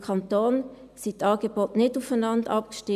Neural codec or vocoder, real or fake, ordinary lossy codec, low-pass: none; real; none; 14.4 kHz